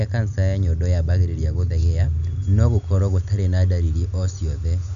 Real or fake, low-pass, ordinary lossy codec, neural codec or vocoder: real; 7.2 kHz; none; none